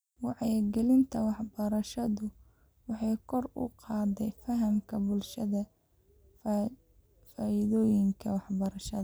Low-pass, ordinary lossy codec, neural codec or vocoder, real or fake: none; none; none; real